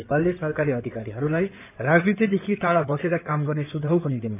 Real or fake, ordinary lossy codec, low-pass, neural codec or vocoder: fake; none; 3.6 kHz; codec, 16 kHz in and 24 kHz out, 2.2 kbps, FireRedTTS-2 codec